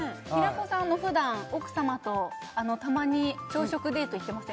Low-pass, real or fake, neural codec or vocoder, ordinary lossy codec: none; real; none; none